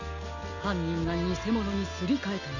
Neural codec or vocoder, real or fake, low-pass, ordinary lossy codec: none; real; 7.2 kHz; none